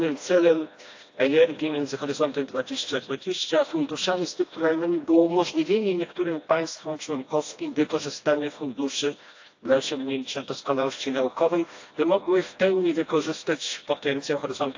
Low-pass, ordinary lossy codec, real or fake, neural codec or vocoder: 7.2 kHz; AAC, 48 kbps; fake; codec, 16 kHz, 1 kbps, FreqCodec, smaller model